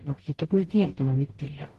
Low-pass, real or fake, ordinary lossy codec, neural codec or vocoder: 14.4 kHz; fake; Opus, 16 kbps; codec, 44.1 kHz, 0.9 kbps, DAC